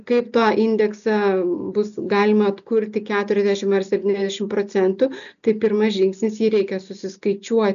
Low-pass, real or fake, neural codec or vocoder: 7.2 kHz; real; none